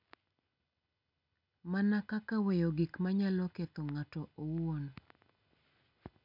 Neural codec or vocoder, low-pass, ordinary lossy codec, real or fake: none; 5.4 kHz; none; real